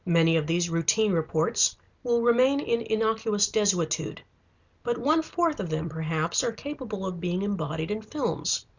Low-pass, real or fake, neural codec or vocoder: 7.2 kHz; real; none